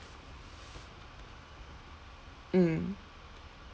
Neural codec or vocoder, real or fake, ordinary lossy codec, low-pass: none; real; none; none